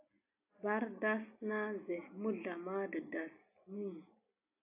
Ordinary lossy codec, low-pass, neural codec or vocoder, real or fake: AAC, 24 kbps; 3.6 kHz; none; real